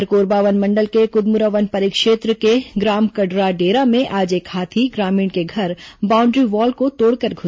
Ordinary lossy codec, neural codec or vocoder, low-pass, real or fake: none; none; none; real